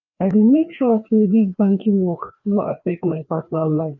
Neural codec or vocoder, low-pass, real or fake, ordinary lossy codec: codec, 16 kHz, 2 kbps, FreqCodec, larger model; 7.2 kHz; fake; none